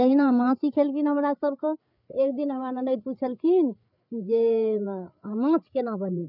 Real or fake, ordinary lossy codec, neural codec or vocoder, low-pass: fake; none; codec, 16 kHz, 8 kbps, FreqCodec, larger model; 5.4 kHz